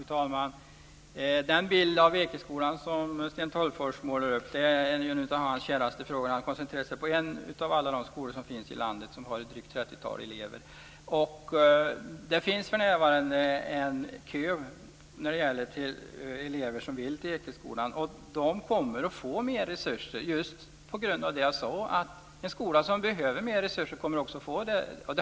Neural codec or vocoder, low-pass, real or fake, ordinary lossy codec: none; none; real; none